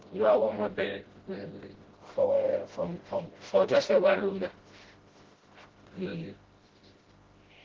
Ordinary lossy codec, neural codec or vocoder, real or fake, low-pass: Opus, 16 kbps; codec, 16 kHz, 0.5 kbps, FreqCodec, smaller model; fake; 7.2 kHz